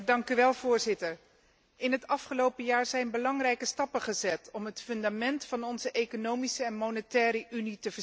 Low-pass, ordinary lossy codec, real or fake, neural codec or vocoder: none; none; real; none